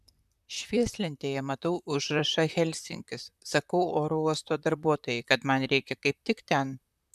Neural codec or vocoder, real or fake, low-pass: vocoder, 44.1 kHz, 128 mel bands, Pupu-Vocoder; fake; 14.4 kHz